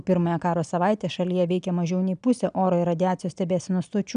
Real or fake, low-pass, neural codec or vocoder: real; 9.9 kHz; none